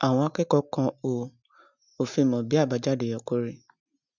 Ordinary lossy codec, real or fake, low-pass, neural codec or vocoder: none; real; 7.2 kHz; none